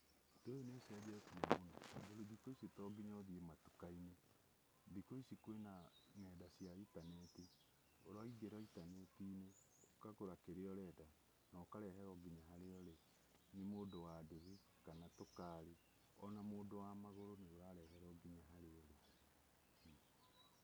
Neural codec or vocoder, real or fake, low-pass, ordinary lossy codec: none; real; none; none